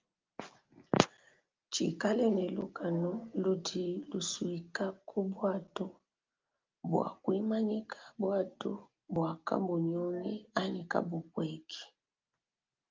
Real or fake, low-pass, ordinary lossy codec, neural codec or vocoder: real; 7.2 kHz; Opus, 24 kbps; none